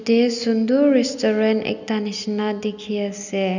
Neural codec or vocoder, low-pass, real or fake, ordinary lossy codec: none; 7.2 kHz; real; none